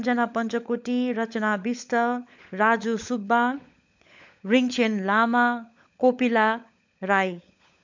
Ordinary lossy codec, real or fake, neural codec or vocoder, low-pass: MP3, 64 kbps; fake; codec, 16 kHz, 16 kbps, FunCodec, trained on LibriTTS, 50 frames a second; 7.2 kHz